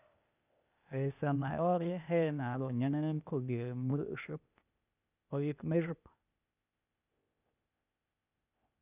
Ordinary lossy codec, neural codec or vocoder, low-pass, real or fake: none; codec, 16 kHz, 0.8 kbps, ZipCodec; 3.6 kHz; fake